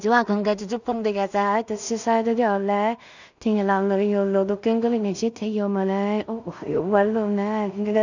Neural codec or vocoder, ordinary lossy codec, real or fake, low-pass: codec, 16 kHz in and 24 kHz out, 0.4 kbps, LongCat-Audio-Codec, two codebook decoder; none; fake; 7.2 kHz